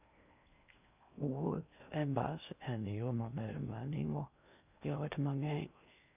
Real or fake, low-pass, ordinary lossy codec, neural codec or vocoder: fake; 3.6 kHz; none; codec, 16 kHz in and 24 kHz out, 0.6 kbps, FocalCodec, streaming, 2048 codes